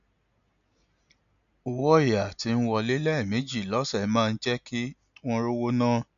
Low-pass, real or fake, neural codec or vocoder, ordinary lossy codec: 7.2 kHz; real; none; none